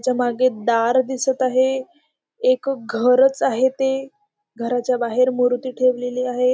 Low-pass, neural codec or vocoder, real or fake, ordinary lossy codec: none; none; real; none